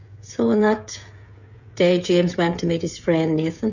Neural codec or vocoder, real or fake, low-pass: codec, 16 kHz, 16 kbps, FreqCodec, smaller model; fake; 7.2 kHz